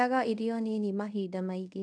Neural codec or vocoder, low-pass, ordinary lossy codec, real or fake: codec, 24 kHz, 0.5 kbps, DualCodec; 9.9 kHz; none; fake